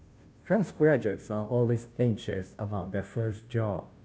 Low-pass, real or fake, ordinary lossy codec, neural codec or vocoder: none; fake; none; codec, 16 kHz, 0.5 kbps, FunCodec, trained on Chinese and English, 25 frames a second